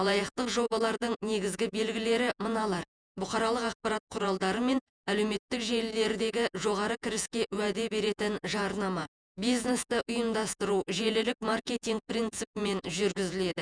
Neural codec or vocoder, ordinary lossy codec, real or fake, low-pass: vocoder, 48 kHz, 128 mel bands, Vocos; none; fake; 9.9 kHz